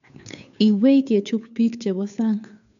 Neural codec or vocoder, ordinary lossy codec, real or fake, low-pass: codec, 16 kHz, 2 kbps, FunCodec, trained on Chinese and English, 25 frames a second; none; fake; 7.2 kHz